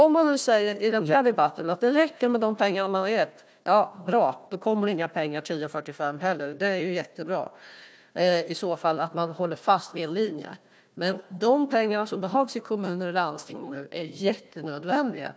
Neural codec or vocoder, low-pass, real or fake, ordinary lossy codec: codec, 16 kHz, 1 kbps, FunCodec, trained on Chinese and English, 50 frames a second; none; fake; none